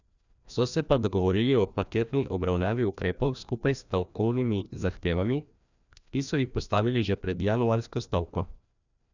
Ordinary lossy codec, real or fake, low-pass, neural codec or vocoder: none; fake; 7.2 kHz; codec, 16 kHz, 1 kbps, FreqCodec, larger model